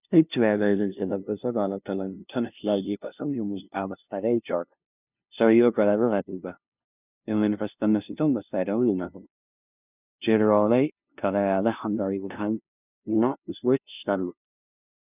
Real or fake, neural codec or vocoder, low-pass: fake; codec, 16 kHz, 0.5 kbps, FunCodec, trained on LibriTTS, 25 frames a second; 3.6 kHz